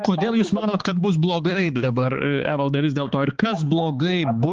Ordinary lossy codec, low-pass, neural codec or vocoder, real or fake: Opus, 16 kbps; 7.2 kHz; codec, 16 kHz, 4 kbps, X-Codec, HuBERT features, trained on balanced general audio; fake